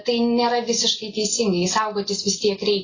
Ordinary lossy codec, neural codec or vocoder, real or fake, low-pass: AAC, 32 kbps; none; real; 7.2 kHz